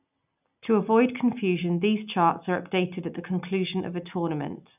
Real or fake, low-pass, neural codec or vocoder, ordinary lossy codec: real; 3.6 kHz; none; none